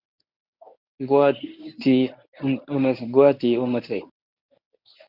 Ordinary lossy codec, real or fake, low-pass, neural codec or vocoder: Opus, 64 kbps; fake; 5.4 kHz; codec, 24 kHz, 0.9 kbps, WavTokenizer, medium speech release version 1